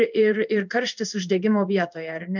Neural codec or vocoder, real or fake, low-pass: codec, 16 kHz in and 24 kHz out, 1 kbps, XY-Tokenizer; fake; 7.2 kHz